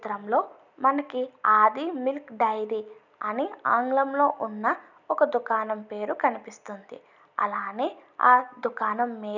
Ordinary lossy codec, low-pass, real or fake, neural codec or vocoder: none; 7.2 kHz; real; none